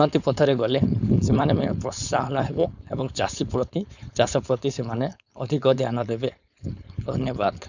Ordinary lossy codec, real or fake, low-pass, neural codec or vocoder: none; fake; 7.2 kHz; codec, 16 kHz, 4.8 kbps, FACodec